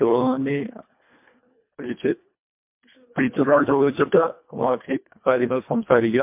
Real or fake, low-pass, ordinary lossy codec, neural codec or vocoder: fake; 3.6 kHz; MP3, 32 kbps; codec, 24 kHz, 1.5 kbps, HILCodec